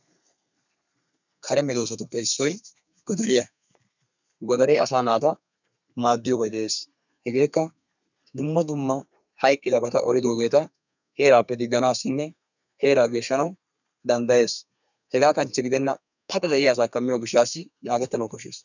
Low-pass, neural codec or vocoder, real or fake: 7.2 kHz; codec, 32 kHz, 1.9 kbps, SNAC; fake